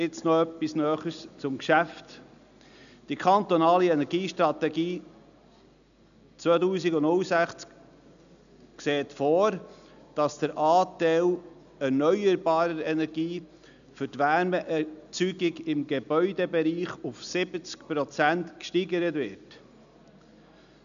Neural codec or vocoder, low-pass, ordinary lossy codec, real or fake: none; 7.2 kHz; none; real